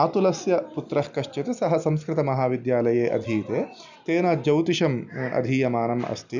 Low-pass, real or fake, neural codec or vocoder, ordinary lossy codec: 7.2 kHz; real; none; none